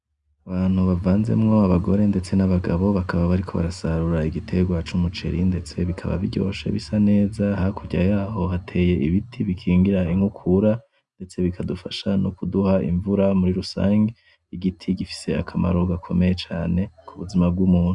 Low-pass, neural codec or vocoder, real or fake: 10.8 kHz; none; real